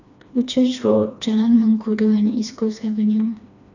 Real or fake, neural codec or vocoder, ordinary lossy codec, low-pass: fake; codec, 16 kHz, 2 kbps, FreqCodec, smaller model; none; 7.2 kHz